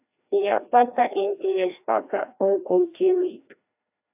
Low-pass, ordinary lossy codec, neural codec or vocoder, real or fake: 3.6 kHz; AAC, 32 kbps; codec, 16 kHz, 1 kbps, FreqCodec, larger model; fake